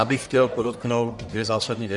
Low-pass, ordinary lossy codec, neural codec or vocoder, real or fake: 10.8 kHz; Opus, 64 kbps; codec, 44.1 kHz, 1.7 kbps, Pupu-Codec; fake